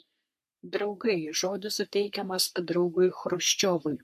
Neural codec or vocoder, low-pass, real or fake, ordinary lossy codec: codec, 44.1 kHz, 3.4 kbps, Pupu-Codec; 14.4 kHz; fake; MP3, 64 kbps